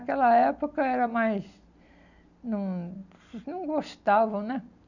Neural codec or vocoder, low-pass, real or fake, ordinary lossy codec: none; 7.2 kHz; real; none